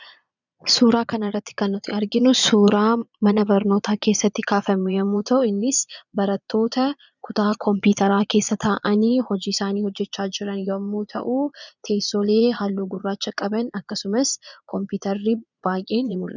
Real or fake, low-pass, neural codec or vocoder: fake; 7.2 kHz; vocoder, 22.05 kHz, 80 mel bands, Vocos